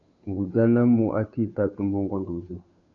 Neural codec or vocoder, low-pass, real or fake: codec, 16 kHz, 2 kbps, FunCodec, trained on Chinese and English, 25 frames a second; 7.2 kHz; fake